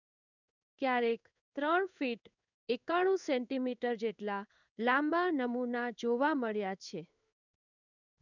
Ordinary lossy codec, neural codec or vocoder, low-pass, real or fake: none; codec, 16 kHz in and 24 kHz out, 1 kbps, XY-Tokenizer; 7.2 kHz; fake